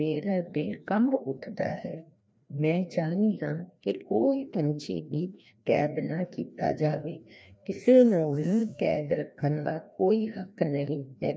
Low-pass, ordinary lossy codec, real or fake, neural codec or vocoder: none; none; fake; codec, 16 kHz, 1 kbps, FreqCodec, larger model